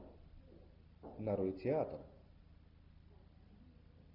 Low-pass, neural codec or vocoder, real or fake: 5.4 kHz; none; real